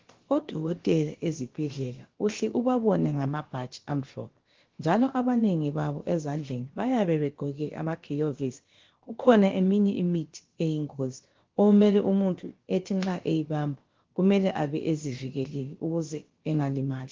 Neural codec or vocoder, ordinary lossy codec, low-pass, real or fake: codec, 16 kHz, about 1 kbps, DyCAST, with the encoder's durations; Opus, 16 kbps; 7.2 kHz; fake